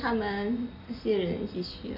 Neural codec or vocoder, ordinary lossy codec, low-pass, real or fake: none; none; 5.4 kHz; real